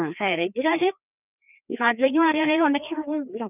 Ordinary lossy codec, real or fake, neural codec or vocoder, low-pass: none; fake; codec, 16 kHz, 2 kbps, FreqCodec, larger model; 3.6 kHz